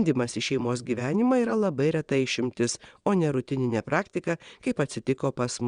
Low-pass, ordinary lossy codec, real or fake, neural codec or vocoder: 9.9 kHz; Opus, 64 kbps; fake; vocoder, 22.05 kHz, 80 mel bands, WaveNeXt